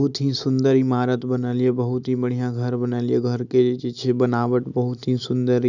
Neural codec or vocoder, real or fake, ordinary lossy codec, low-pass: none; real; none; 7.2 kHz